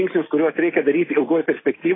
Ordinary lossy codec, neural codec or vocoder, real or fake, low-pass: AAC, 16 kbps; none; real; 7.2 kHz